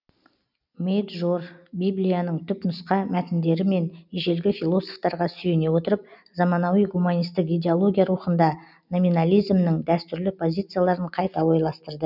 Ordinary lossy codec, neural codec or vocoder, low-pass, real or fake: none; none; 5.4 kHz; real